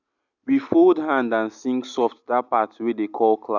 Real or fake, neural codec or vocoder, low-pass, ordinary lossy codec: real; none; 7.2 kHz; none